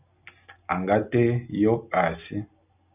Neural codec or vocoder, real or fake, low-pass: none; real; 3.6 kHz